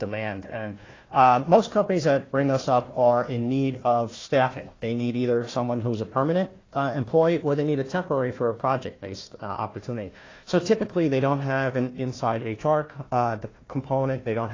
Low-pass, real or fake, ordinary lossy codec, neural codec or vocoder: 7.2 kHz; fake; AAC, 32 kbps; codec, 16 kHz, 1 kbps, FunCodec, trained on Chinese and English, 50 frames a second